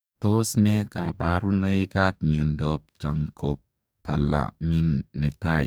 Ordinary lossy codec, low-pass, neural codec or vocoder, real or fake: none; none; codec, 44.1 kHz, 2.6 kbps, DAC; fake